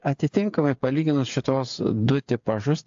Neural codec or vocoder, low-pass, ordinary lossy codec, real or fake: codec, 16 kHz, 8 kbps, FreqCodec, smaller model; 7.2 kHz; AAC, 64 kbps; fake